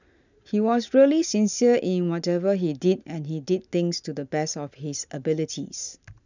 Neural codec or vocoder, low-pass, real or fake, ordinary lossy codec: none; 7.2 kHz; real; none